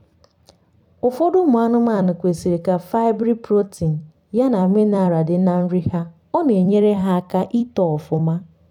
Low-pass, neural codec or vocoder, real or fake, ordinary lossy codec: 19.8 kHz; vocoder, 44.1 kHz, 128 mel bands every 256 samples, BigVGAN v2; fake; none